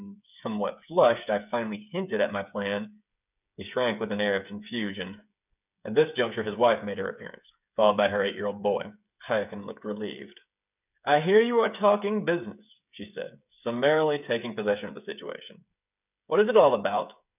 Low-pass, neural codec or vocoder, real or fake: 3.6 kHz; codec, 16 kHz, 8 kbps, FreqCodec, smaller model; fake